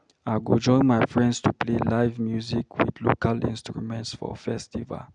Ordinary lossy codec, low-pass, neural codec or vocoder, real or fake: none; 9.9 kHz; none; real